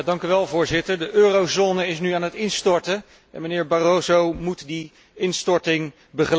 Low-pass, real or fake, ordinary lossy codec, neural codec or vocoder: none; real; none; none